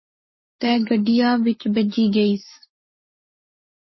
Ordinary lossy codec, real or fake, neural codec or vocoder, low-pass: MP3, 24 kbps; fake; codec, 44.1 kHz, 7.8 kbps, Pupu-Codec; 7.2 kHz